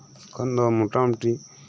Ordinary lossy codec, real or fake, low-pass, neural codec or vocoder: none; real; none; none